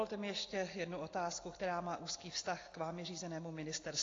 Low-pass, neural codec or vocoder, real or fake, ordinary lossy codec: 7.2 kHz; none; real; AAC, 32 kbps